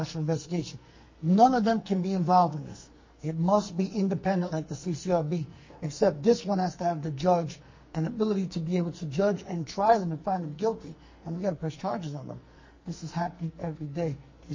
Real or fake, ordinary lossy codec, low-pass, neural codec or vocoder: fake; MP3, 32 kbps; 7.2 kHz; codec, 44.1 kHz, 2.6 kbps, SNAC